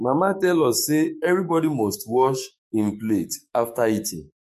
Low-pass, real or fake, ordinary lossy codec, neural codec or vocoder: 14.4 kHz; fake; MP3, 64 kbps; codec, 44.1 kHz, 7.8 kbps, DAC